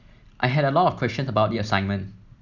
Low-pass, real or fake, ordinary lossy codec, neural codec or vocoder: 7.2 kHz; real; none; none